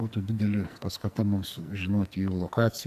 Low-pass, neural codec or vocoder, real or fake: 14.4 kHz; codec, 44.1 kHz, 2.6 kbps, SNAC; fake